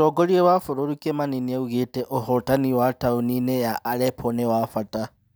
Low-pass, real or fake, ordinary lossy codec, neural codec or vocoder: none; real; none; none